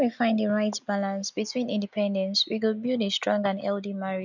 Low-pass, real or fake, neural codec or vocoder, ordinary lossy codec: 7.2 kHz; real; none; none